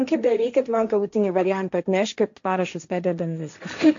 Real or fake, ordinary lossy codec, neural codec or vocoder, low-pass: fake; AAC, 64 kbps; codec, 16 kHz, 1.1 kbps, Voila-Tokenizer; 7.2 kHz